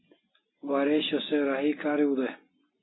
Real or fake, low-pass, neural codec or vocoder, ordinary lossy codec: real; 7.2 kHz; none; AAC, 16 kbps